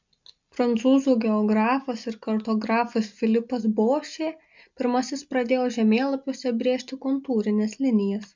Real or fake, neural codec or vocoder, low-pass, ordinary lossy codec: real; none; 7.2 kHz; MP3, 64 kbps